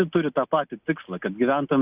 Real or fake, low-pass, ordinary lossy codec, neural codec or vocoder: real; 3.6 kHz; Opus, 64 kbps; none